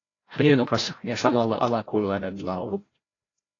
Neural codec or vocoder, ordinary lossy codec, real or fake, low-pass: codec, 16 kHz, 0.5 kbps, FreqCodec, larger model; AAC, 32 kbps; fake; 7.2 kHz